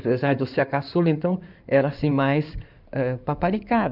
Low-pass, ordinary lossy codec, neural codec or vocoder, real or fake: 5.4 kHz; none; codec, 16 kHz in and 24 kHz out, 2.2 kbps, FireRedTTS-2 codec; fake